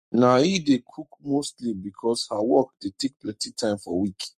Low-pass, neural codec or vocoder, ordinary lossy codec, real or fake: 14.4 kHz; codec, 44.1 kHz, 7.8 kbps, Pupu-Codec; MP3, 64 kbps; fake